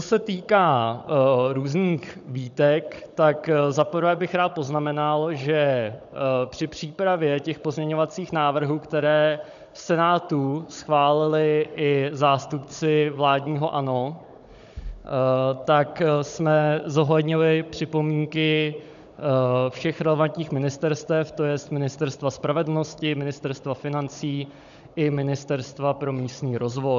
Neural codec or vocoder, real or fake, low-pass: codec, 16 kHz, 16 kbps, FunCodec, trained on Chinese and English, 50 frames a second; fake; 7.2 kHz